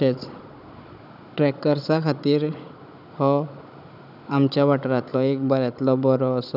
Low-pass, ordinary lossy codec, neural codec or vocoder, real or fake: 5.4 kHz; none; codec, 16 kHz, 16 kbps, FunCodec, trained on Chinese and English, 50 frames a second; fake